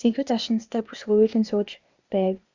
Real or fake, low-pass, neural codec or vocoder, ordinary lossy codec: fake; 7.2 kHz; codec, 16 kHz, 0.8 kbps, ZipCodec; Opus, 64 kbps